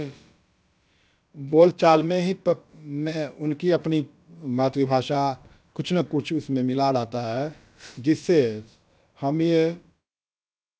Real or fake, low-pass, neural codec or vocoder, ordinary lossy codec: fake; none; codec, 16 kHz, about 1 kbps, DyCAST, with the encoder's durations; none